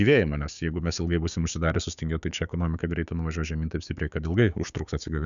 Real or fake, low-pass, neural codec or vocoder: fake; 7.2 kHz; codec, 16 kHz, 6 kbps, DAC